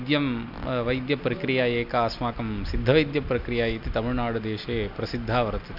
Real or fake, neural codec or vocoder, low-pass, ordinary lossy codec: real; none; 5.4 kHz; none